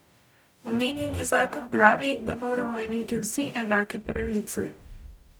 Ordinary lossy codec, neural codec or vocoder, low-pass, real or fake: none; codec, 44.1 kHz, 0.9 kbps, DAC; none; fake